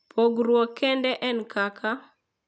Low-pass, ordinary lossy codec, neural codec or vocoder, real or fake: none; none; none; real